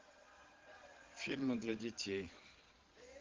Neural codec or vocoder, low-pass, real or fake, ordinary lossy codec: vocoder, 22.05 kHz, 80 mel bands, Vocos; 7.2 kHz; fake; Opus, 16 kbps